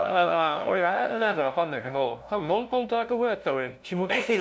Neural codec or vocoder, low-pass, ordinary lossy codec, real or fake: codec, 16 kHz, 0.5 kbps, FunCodec, trained on LibriTTS, 25 frames a second; none; none; fake